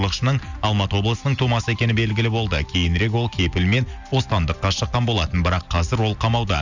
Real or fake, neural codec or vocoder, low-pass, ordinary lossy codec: real; none; 7.2 kHz; none